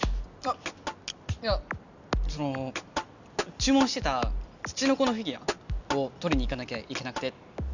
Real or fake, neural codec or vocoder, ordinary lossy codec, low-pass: real; none; none; 7.2 kHz